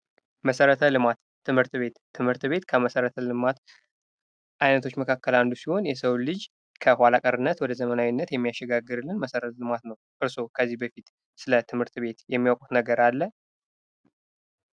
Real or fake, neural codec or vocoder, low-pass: real; none; 9.9 kHz